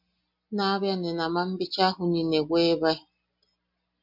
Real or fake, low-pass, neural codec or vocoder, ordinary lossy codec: real; 5.4 kHz; none; MP3, 48 kbps